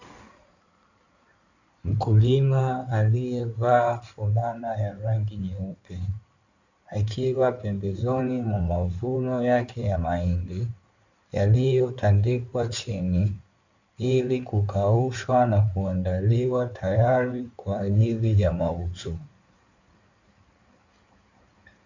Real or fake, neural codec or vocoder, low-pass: fake; codec, 16 kHz in and 24 kHz out, 2.2 kbps, FireRedTTS-2 codec; 7.2 kHz